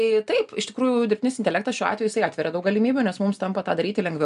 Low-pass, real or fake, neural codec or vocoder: 10.8 kHz; real; none